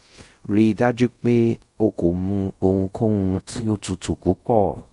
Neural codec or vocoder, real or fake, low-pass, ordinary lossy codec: codec, 24 kHz, 0.5 kbps, DualCodec; fake; 10.8 kHz; MP3, 64 kbps